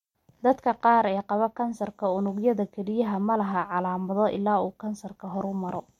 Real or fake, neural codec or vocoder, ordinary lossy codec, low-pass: real; none; MP3, 64 kbps; 19.8 kHz